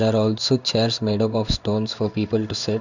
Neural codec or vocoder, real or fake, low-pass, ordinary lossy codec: codec, 16 kHz in and 24 kHz out, 1 kbps, XY-Tokenizer; fake; 7.2 kHz; none